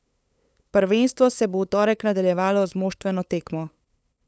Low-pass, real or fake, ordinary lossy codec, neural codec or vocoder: none; fake; none; codec, 16 kHz, 8 kbps, FunCodec, trained on LibriTTS, 25 frames a second